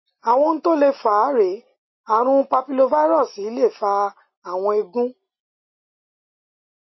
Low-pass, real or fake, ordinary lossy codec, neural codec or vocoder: 7.2 kHz; real; MP3, 24 kbps; none